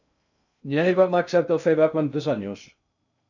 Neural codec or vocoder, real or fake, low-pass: codec, 16 kHz in and 24 kHz out, 0.6 kbps, FocalCodec, streaming, 2048 codes; fake; 7.2 kHz